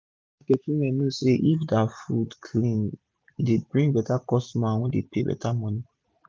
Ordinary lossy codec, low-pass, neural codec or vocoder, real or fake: none; none; none; real